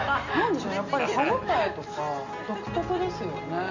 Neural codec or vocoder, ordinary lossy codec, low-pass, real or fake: none; Opus, 64 kbps; 7.2 kHz; real